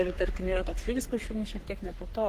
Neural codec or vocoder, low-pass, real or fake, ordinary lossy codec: codec, 44.1 kHz, 3.4 kbps, Pupu-Codec; 14.4 kHz; fake; Opus, 16 kbps